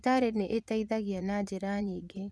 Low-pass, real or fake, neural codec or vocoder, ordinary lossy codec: none; fake; vocoder, 22.05 kHz, 80 mel bands, Vocos; none